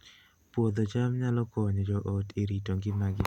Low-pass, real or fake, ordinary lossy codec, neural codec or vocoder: 19.8 kHz; real; none; none